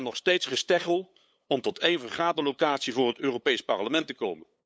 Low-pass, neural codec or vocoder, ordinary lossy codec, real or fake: none; codec, 16 kHz, 8 kbps, FunCodec, trained on LibriTTS, 25 frames a second; none; fake